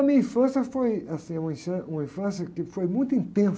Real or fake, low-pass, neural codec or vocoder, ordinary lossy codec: real; none; none; none